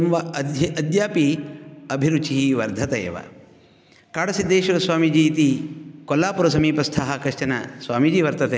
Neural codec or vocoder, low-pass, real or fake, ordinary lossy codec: none; none; real; none